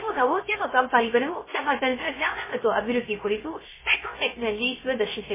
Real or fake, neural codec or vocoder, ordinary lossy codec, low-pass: fake; codec, 16 kHz, 0.3 kbps, FocalCodec; MP3, 16 kbps; 3.6 kHz